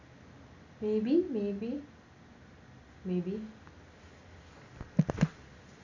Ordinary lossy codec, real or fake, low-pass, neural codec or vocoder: none; real; 7.2 kHz; none